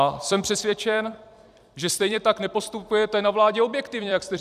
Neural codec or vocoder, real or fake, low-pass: vocoder, 44.1 kHz, 128 mel bands every 256 samples, BigVGAN v2; fake; 14.4 kHz